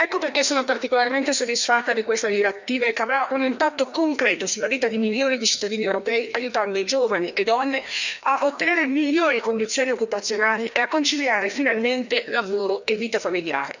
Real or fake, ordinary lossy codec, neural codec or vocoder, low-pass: fake; none; codec, 16 kHz, 1 kbps, FreqCodec, larger model; 7.2 kHz